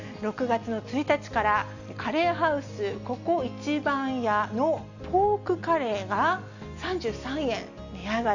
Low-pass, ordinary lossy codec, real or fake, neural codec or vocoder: 7.2 kHz; none; real; none